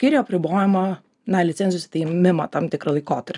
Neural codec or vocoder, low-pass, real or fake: none; 10.8 kHz; real